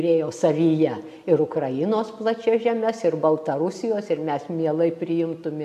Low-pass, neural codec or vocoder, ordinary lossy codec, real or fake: 14.4 kHz; none; AAC, 96 kbps; real